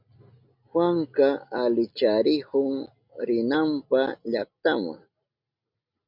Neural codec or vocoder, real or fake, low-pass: none; real; 5.4 kHz